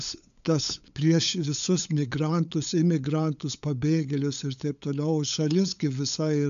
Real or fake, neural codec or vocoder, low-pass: fake; codec, 16 kHz, 8 kbps, FunCodec, trained on LibriTTS, 25 frames a second; 7.2 kHz